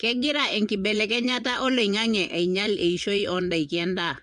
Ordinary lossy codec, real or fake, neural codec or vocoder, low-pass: MP3, 64 kbps; fake; vocoder, 22.05 kHz, 80 mel bands, WaveNeXt; 9.9 kHz